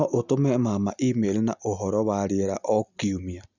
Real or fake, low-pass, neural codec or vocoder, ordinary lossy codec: real; 7.2 kHz; none; none